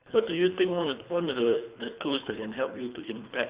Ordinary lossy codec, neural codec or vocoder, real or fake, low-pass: Opus, 64 kbps; codec, 24 kHz, 3 kbps, HILCodec; fake; 3.6 kHz